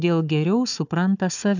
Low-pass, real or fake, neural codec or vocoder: 7.2 kHz; fake; codec, 16 kHz, 4 kbps, FunCodec, trained on Chinese and English, 50 frames a second